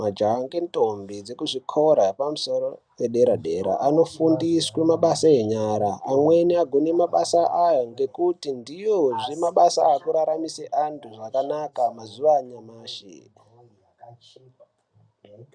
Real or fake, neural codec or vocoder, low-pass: real; none; 9.9 kHz